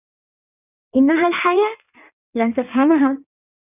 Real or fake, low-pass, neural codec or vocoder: fake; 3.6 kHz; codec, 16 kHz in and 24 kHz out, 1.1 kbps, FireRedTTS-2 codec